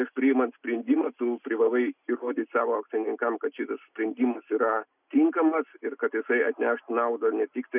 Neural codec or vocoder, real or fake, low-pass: none; real; 3.6 kHz